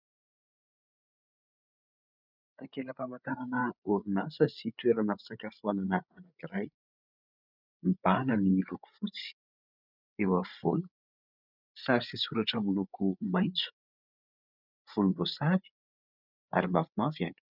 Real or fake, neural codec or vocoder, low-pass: fake; codec, 16 kHz, 8 kbps, FreqCodec, larger model; 5.4 kHz